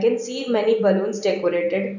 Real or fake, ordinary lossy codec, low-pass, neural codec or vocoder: real; none; 7.2 kHz; none